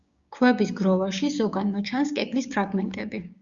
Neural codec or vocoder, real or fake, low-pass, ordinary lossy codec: codec, 16 kHz, 6 kbps, DAC; fake; 7.2 kHz; Opus, 64 kbps